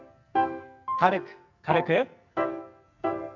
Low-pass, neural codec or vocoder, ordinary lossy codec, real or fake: 7.2 kHz; codec, 44.1 kHz, 2.6 kbps, SNAC; none; fake